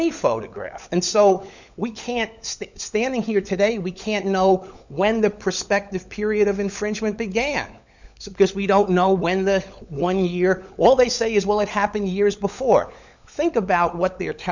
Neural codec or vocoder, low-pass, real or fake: codec, 16 kHz, 16 kbps, FunCodec, trained on LibriTTS, 50 frames a second; 7.2 kHz; fake